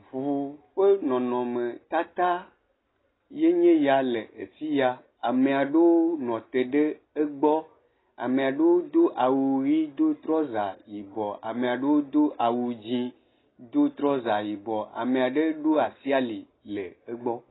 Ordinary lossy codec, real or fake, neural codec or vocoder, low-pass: AAC, 16 kbps; real; none; 7.2 kHz